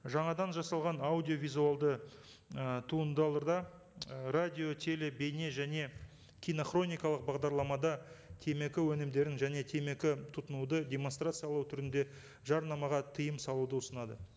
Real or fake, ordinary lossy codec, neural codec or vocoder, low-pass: real; none; none; none